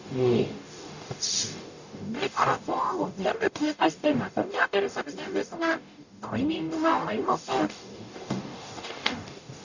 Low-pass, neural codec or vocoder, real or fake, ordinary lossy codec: 7.2 kHz; codec, 44.1 kHz, 0.9 kbps, DAC; fake; none